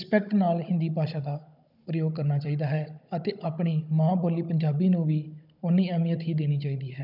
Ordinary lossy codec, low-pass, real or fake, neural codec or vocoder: none; 5.4 kHz; fake; codec, 16 kHz, 16 kbps, FunCodec, trained on Chinese and English, 50 frames a second